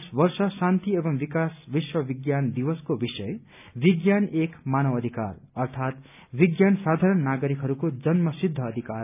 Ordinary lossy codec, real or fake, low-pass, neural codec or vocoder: none; real; 3.6 kHz; none